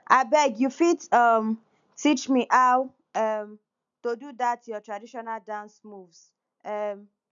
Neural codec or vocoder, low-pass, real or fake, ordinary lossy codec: none; 7.2 kHz; real; none